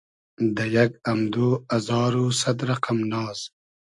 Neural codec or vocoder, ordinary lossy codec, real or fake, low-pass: none; AAC, 64 kbps; real; 10.8 kHz